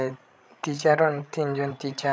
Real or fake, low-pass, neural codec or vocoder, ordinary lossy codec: fake; none; codec, 16 kHz, 16 kbps, FreqCodec, larger model; none